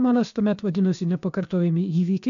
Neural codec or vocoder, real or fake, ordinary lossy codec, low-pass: codec, 16 kHz, about 1 kbps, DyCAST, with the encoder's durations; fake; MP3, 64 kbps; 7.2 kHz